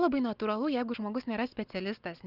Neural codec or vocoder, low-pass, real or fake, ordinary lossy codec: none; 5.4 kHz; real; Opus, 24 kbps